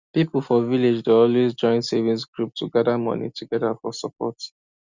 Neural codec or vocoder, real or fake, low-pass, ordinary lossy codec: none; real; 7.2 kHz; Opus, 64 kbps